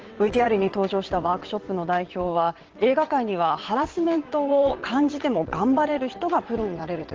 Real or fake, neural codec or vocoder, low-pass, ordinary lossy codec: fake; vocoder, 22.05 kHz, 80 mel bands, WaveNeXt; 7.2 kHz; Opus, 16 kbps